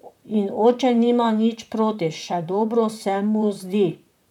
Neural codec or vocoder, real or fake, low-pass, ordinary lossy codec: vocoder, 44.1 kHz, 128 mel bands, Pupu-Vocoder; fake; 19.8 kHz; none